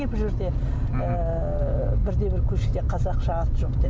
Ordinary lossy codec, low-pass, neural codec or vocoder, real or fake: none; none; none; real